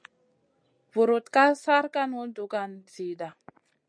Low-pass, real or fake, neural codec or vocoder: 9.9 kHz; real; none